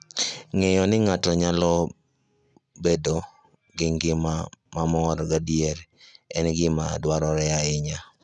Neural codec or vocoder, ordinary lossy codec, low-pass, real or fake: none; none; 9.9 kHz; real